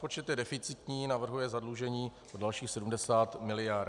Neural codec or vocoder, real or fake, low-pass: none; real; 10.8 kHz